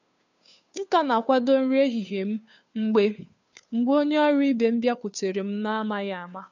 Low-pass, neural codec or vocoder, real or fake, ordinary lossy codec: 7.2 kHz; codec, 16 kHz, 2 kbps, FunCodec, trained on Chinese and English, 25 frames a second; fake; none